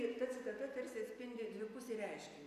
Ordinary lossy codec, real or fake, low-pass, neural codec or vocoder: MP3, 96 kbps; real; 14.4 kHz; none